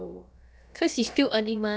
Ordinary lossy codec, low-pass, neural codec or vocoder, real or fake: none; none; codec, 16 kHz, about 1 kbps, DyCAST, with the encoder's durations; fake